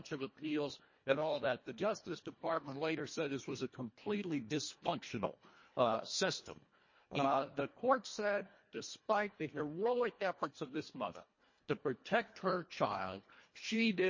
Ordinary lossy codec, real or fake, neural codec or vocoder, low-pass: MP3, 32 kbps; fake; codec, 24 kHz, 1.5 kbps, HILCodec; 7.2 kHz